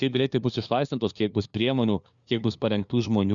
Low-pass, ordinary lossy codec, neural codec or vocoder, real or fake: 7.2 kHz; Opus, 64 kbps; codec, 16 kHz, 2 kbps, FunCodec, trained on LibriTTS, 25 frames a second; fake